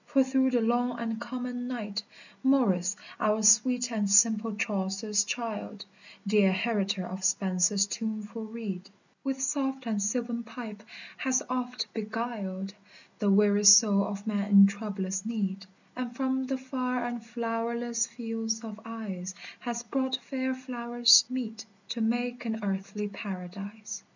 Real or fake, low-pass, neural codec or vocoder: real; 7.2 kHz; none